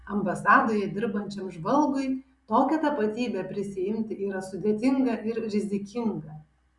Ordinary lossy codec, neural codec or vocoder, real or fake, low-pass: Opus, 64 kbps; none; real; 10.8 kHz